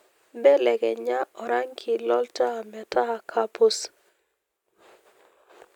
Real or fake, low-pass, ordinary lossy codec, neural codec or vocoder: real; 19.8 kHz; none; none